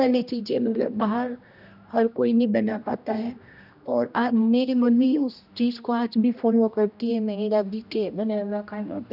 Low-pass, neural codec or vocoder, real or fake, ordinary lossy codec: 5.4 kHz; codec, 16 kHz, 1 kbps, X-Codec, HuBERT features, trained on general audio; fake; none